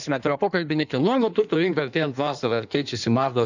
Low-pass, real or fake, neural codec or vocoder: 7.2 kHz; fake; codec, 16 kHz in and 24 kHz out, 1.1 kbps, FireRedTTS-2 codec